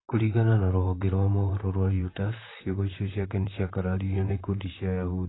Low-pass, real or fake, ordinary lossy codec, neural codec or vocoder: 7.2 kHz; fake; AAC, 16 kbps; vocoder, 44.1 kHz, 128 mel bands, Pupu-Vocoder